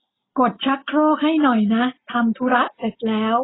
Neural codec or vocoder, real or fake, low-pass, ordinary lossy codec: none; real; 7.2 kHz; AAC, 16 kbps